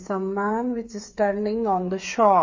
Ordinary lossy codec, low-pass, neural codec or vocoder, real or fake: MP3, 32 kbps; 7.2 kHz; codec, 16 kHz, 8 kbps, FreqCodec, smaller model; fake